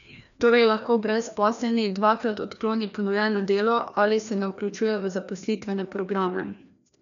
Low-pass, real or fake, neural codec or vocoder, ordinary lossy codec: 7.2 kHz; fake; codec, 16 kHz, 1 kbps, FreqCodec, larger model; none